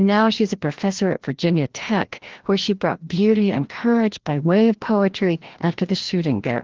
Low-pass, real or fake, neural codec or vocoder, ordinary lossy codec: 7.2 kHz; fake; codec, 16 kHz, 1 kbps, FreqCodec, larger model; Opus, 16 kbps